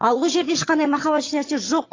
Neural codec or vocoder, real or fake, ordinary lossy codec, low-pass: vocoder, 22.05 kHz, 80 mel bands, HiFi-GAN; fake; AAC, 32 kbps; 7.2 kHz